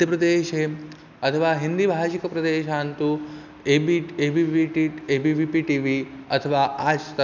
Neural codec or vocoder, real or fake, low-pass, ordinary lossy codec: none; real; 7.2 kHz; none